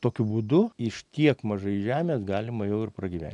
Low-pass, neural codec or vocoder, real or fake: 10.8 kHz; none; real